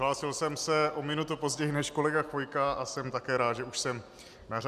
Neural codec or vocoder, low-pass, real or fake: none; 14.4 kHz; real